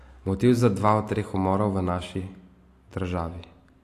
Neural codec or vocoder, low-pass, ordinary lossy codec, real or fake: none; 14.4 kHz; AAC, 64 kbps; real